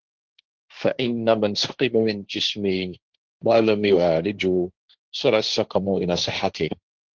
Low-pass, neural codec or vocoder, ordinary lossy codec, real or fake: 7.2 kHz; codec, 16 kHz, 1.1 kbps, Voila-Tokenizer; Opus, 16 kbps; fake